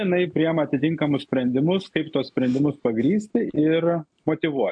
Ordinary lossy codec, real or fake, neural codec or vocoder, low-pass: AAC, 64 kbps; real; none; 9.9 kHz